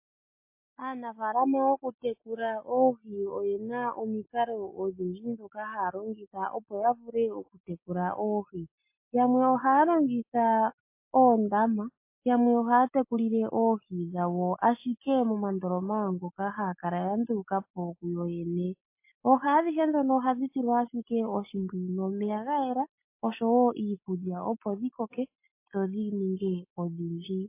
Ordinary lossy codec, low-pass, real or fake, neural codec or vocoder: MP3, 24 kbps; 3.6 kHz; real; none